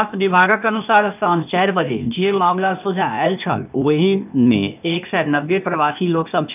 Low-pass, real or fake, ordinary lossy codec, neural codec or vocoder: 3.6 kHz; fake; none; codec, 16 kHz, 0.8 kbps, ZipCodec